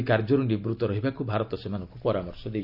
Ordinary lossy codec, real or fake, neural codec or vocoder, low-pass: none; real; none; 5.4 kHz